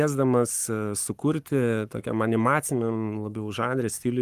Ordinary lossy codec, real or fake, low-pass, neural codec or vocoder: Opus, 32 kbps; fake; 14.4 kHz; codec, 44.1 kHz, 7.8 kbps, Pupu-Codec